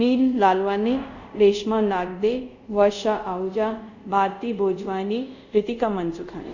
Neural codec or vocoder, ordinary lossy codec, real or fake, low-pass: codec, 24 kHz, 0.5 kbps, DualCodec; none; fake; 7.2 kHz